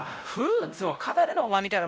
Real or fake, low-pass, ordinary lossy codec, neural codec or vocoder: fake; none; none; codec, 16 kHz, 0.5 kbps, X-Codec, WavLM features, trained on Multilingual LibriSpeech